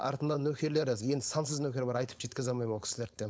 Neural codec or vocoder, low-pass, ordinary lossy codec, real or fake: codec, 16 kHz, 4.8 kbps, FACodec; none; none; fake